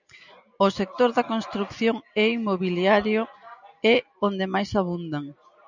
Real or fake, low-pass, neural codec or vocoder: real; 7.2 kHz; none